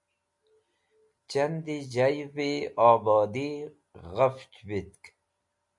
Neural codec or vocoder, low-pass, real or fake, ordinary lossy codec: none; 10.8 kHz; real; MP3, 64 kbps